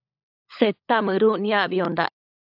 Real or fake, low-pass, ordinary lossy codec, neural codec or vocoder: fake; 5.4 kHz; AAC, 48 kbps; codec, 16 kHz, 16 kbps, FunCodec, trained on LibriTTS, 50 frames a second